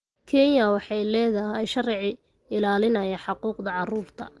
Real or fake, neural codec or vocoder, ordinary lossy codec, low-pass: real; none; Opus, 24 kbps; 10.8 kHz